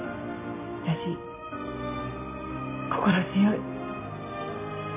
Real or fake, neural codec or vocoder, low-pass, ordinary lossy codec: real; none; 3.6 kHz; none